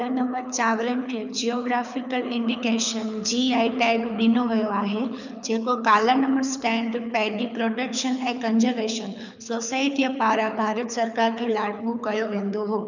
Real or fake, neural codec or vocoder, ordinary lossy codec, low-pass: fake; codec, 24 kHz, 6 kbps, HILCodec; none; 7.2 kHz